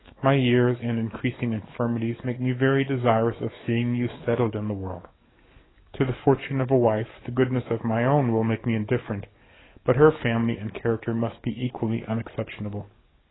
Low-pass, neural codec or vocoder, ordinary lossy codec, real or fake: 7.2 kHz; codec, 44.1 kHz, 7.8 kbps, DAC; AAC, 16 kbps; fake